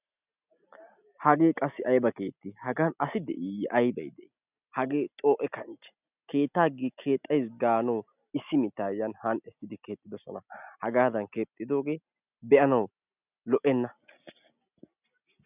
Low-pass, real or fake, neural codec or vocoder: 3.6 kHz; real; none